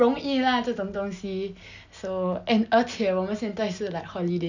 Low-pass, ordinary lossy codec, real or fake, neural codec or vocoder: 7.2 kHz; none; real; none